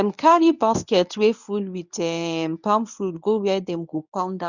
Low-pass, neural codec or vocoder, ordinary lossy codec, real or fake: 7.2 kHz; codec, 24 kHz, 0.9 kbps, WavTokenizer, medium speech release version 1; none; fake